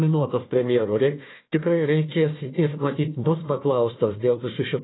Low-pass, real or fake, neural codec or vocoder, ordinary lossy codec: 7.2 kHz; fake; codec, 16 kHz, 1 kbps, FunCodec, trained on Chinese and English, 50 frames a second; AAC, 16 kbps